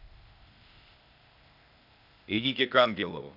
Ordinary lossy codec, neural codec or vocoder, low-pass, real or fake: none; codec, 16 kHz, 0.8 kbps, ZipCodec; 5.4 kHz; fake